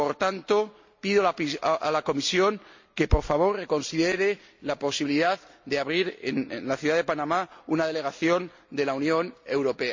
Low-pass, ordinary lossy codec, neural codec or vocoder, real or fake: 7.2 kHz; MP3, 48 kbps; none; real